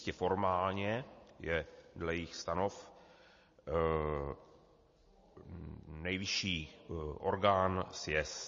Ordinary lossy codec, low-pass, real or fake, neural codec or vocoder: MP3, 32 kbps; 7.2 kHz; real; none